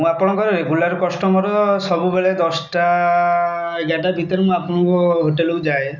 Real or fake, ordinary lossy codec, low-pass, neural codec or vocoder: real; none; 7.2 kHz; none